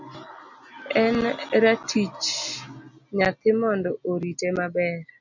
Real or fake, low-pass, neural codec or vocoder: real; 7.2 kHz; none